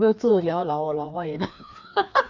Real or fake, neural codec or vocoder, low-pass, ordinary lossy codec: fake; codec, 16 kHz, 2 kbps, FreqCodec, larger model; 7.2 kHz; none